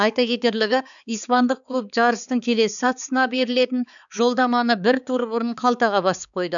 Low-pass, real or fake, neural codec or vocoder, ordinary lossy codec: 7.2 kHz; fake; codec, 16 kHz, 4 kbps, X-Codec, HuBERT features, trained on balanced general audio; none